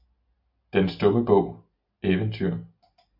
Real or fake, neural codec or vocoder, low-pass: real; none; 5.4 kHz